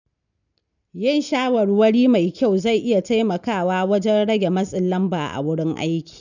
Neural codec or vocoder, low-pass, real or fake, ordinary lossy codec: none; 7.2 kHz; real; none